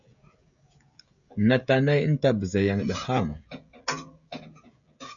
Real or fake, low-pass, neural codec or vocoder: fake; 7.2 kHz; codec, 16 kHz, 16 kbps, FreqCodec, smaller model